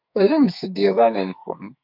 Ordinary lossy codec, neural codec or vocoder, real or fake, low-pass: AAC, 48 kbps; codec, 44.1 kHz, 2.6 kbps, SNAC; fake; 5.4 kHz